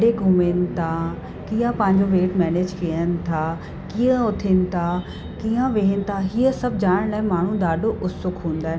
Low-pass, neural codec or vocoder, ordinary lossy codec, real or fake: none; none; none; real